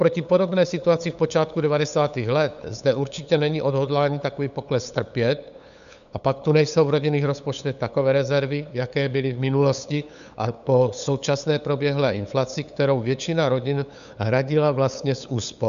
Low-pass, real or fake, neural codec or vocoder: 7.2 kHz; fake; codec, 16 kHz, 8 kbps, FunCodec, trained on LibriTTS, 25 frames a second